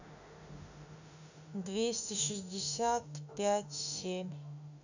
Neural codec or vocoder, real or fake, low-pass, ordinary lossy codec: autoencoder, 48 kHz, 32 numbers a frame, DAC-VAE, trained on Japanese speech; fake; 7.2 kHz; none